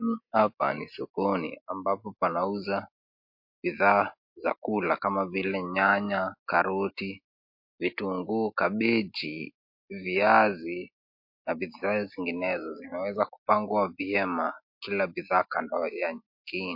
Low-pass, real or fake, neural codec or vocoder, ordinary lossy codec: 5.4 kHz; real; none; MP3, 32 kbps